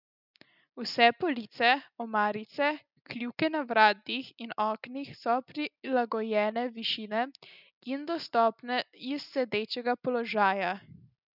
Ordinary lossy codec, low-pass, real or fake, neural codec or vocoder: none; 5.4 kHz; real; none